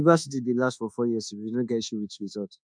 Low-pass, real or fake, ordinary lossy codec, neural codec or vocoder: 9.9 kHz; fake; none; codec, 24 kHz, 1.2 kbps, DualCodec